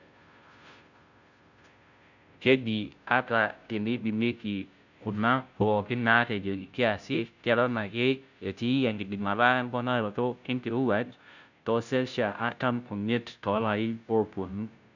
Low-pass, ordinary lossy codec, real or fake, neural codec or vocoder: 7.2 kHz; none; fake; codec, 16 kHz, 0.5 kbps, FunCodec, trained on Chinese and English, 25 frames a second